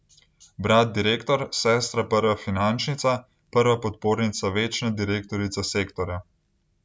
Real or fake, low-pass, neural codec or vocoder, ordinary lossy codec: real; none; none; none